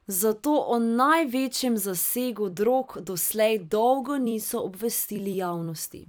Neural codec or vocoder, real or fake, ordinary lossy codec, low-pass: vocoder, 44.1 kHz, 128 mel bands every 256 samples, BigVGAN v2; fake; none; none